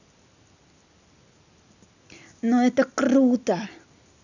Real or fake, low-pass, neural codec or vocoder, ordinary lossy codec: real; 7.2 kHz; none; none